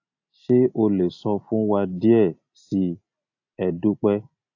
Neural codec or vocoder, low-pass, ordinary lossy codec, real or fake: none; 7.2 kHz; AAC, 48 kbps; real